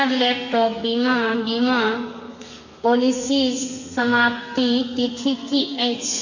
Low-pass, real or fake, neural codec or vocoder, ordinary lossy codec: 7.2 kHz; fake; codec, 32 kHz, 1.9 kbps, SNAC; none